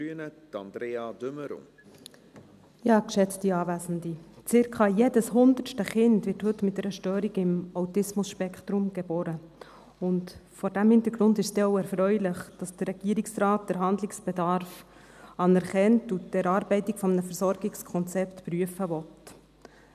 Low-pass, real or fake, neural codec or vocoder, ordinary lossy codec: 14.4 kHz; real; none; none